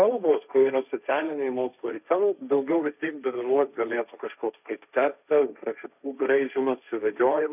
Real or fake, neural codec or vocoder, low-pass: fake; codec, 16 kHz, 1.1 kbps, Voila-Tokenizer; 3.6 kHz